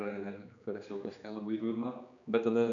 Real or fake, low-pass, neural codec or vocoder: fake; 7.2 kHz; codec, 16 kHz, 2 kbps, X-Codec, HuBERT features, trained on general audio